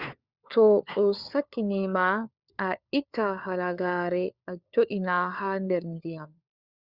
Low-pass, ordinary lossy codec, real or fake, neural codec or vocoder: 5.4 kHz; Opus, 64 kbps; fake; codec, 16 kHz, 4 kbps, FunCodec, trained on LibriTTS, 50 frames a second